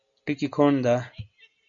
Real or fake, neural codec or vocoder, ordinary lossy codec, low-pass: real; none; MP3, 96 kbps; 7.2 kHz